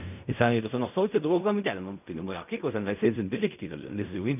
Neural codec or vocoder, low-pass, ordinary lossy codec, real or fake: codec, 16 kHz in and 24 kHz out, 0.4 kbps, LongCat-Audio-Codec, fine tuned four codebook decoder; 3.6 kHz; none; fake